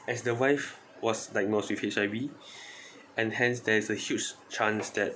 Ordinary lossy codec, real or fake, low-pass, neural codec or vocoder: none; real; none; none